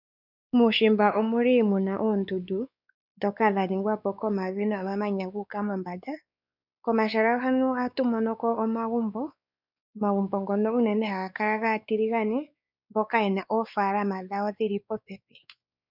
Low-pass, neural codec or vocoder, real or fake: 5.4 kHz; codec, 16 kHz, 4 kbps, X-Codec, WavLM features, trained on Multilingual LibriSpeech; fake